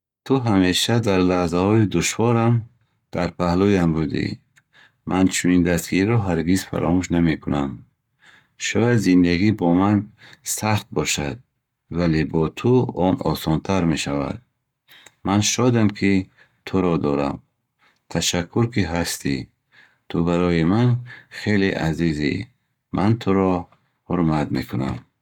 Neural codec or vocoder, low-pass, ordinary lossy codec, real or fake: codec, 44.1 kHz, 7.8 kbps, Pupu-Codec; 19.8 kHz; none; fake